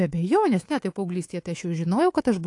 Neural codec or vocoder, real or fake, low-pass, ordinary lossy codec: codec, 24 kHz, 3.1 kbps, DualCodec; fake; 10.8 kHz; AAC, 48 kbps